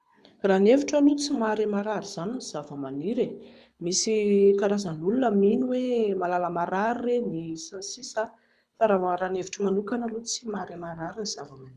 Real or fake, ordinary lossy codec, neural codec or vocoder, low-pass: fake; none; codec, 24 kHz, 6 kbps, HILCodec; none